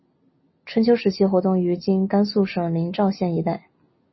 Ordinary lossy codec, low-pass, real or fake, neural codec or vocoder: MP3, 24 kbps; 7.2 kHz; real; none